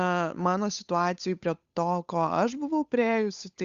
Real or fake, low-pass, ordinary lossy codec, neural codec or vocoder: fake; 7.2 kHz; Opus, 64 kbps; codec, 16 kHz, 8 kbps, FunCodec, trained on Chinese and English, 25 frames a second